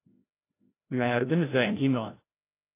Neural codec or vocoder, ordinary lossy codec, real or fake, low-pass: codec, 16 kHz, 0.5 kbps, FreqCodec, larger model; AAC, 24 kbps; fake; 3.6 kHz